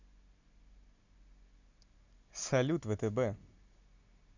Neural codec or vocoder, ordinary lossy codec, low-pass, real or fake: none; none; 7.2 kHz; real